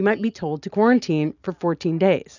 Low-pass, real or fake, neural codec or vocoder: 7.2 kHz; real; none